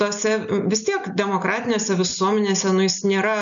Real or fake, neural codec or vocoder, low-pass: real; none; 7.2 kHz